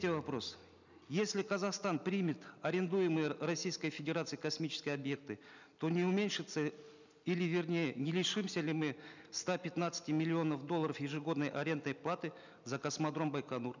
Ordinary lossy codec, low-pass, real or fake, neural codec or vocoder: none; 7.2 kHz; real; none